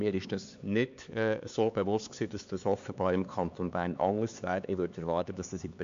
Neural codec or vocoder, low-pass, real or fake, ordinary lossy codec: codec, 16 kHz, 4 kbps, X-Codec, HuBERT features, trained on LibriSpeech; 7.2 kHz; fake; MP3, 64 kbps